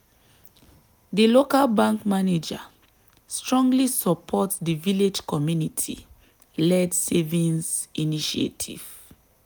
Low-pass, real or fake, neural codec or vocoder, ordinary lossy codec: none; real; none; none